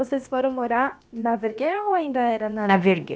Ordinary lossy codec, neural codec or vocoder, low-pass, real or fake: none; codec, 16 kHz, about 1 kbps, DyCAST, with the encoder's durations; none; fake